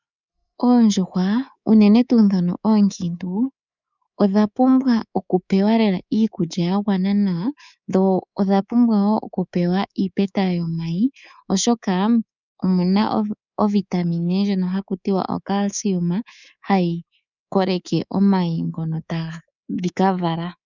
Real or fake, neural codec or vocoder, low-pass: fake; codec, 24 kHz, 3.1 kbps, DualCodec; 7.2 kHz